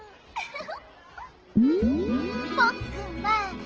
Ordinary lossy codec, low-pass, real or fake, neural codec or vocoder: Opus, 16 kbps; 7.2 kHz; real; none